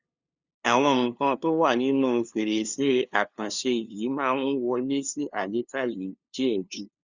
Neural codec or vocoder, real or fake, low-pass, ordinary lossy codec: codec, 16 kHz, 2 kbps, FunCodec, trained on LibriTTS, 25 frames a second; fake; 7.2 kHz; Opus, 64 kbps